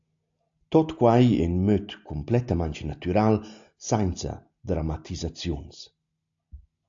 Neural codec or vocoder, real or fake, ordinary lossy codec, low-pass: none; real; AAC, 64 kbps; 7.2 kHz